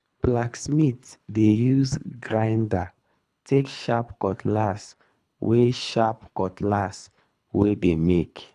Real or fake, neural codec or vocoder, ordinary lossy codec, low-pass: fake; codec, 24 kHz, 3 kbps, HILCodec; none; none